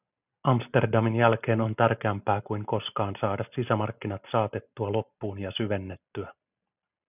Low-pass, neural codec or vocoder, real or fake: 3.6 kHz; none; real